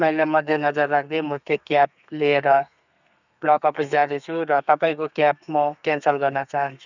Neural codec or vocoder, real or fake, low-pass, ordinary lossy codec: codec, 32 kHz, 1.9 kbps, SNAC; fake; 7.2 kHz; none